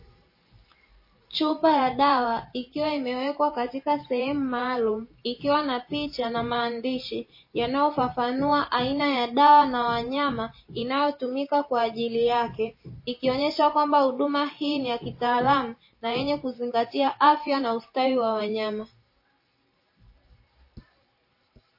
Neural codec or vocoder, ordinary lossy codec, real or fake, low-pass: vocoder, 44.1 kHz, 128 mel bands every 512 samples, BigVGAN v2; MP3, 24 kbps; fake; 5.4 kHz